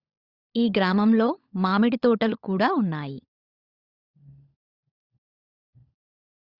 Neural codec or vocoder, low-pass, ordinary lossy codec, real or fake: codec, 16 kHz, 16 kbps, FunCodec, trained on LibriTTS, 50 frames a second; 5.4 kHz; Opus, 64 kbps; fake